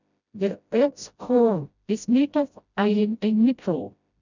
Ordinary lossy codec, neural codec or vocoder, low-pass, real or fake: none; codec, 16 kHz, 0.5 kbps, FreqCodec, smaller model; 7.2 kHz; fake